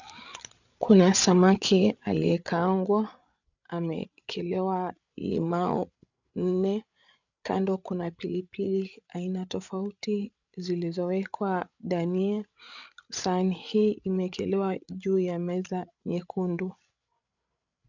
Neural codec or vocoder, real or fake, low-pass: codec, 16 kHz, 8 kbps, FreqCodec, larger model; fake; 7.2 kHz